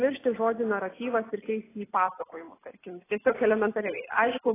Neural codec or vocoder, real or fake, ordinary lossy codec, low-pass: none; real; AAC, 16 kbps; 3.6 kHz